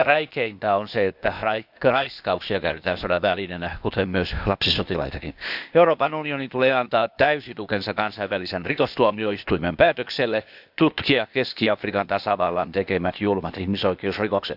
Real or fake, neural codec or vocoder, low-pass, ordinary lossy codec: fake; codec, 16 kHz, 0.8 kbps, ZipCodec; 5.4 kHz; none